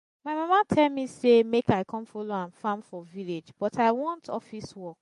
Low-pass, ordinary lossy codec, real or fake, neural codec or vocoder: 14.4 kHz; MP3, 48 kbps; real; none